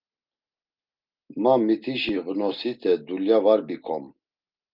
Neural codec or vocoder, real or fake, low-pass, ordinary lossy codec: none; real; 5.4 kHz; Opus, 24 kbps